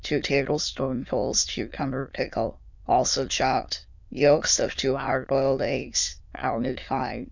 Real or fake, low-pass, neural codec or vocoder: fake; 7.2 kHz; autoencoder, 22.05 kHz, a latent of 192 numbers a frame, VITS, trained on many speakers